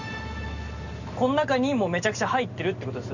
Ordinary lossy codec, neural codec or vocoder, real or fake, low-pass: none; none; real; 7.2 kHz